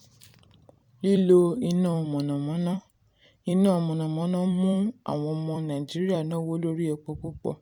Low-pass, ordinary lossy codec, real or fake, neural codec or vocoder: 19.8 kHz; none; fake; vocoder, 44.1 kHz, 128 mel bands every 256 samples, BigVGAN v2